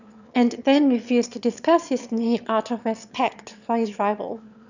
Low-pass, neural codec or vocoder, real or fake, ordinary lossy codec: 7.2 kHz; autoencoder, 22.05 kHz, a latent of 192 numbers a frame, VITS, trained on one speaker; fake; none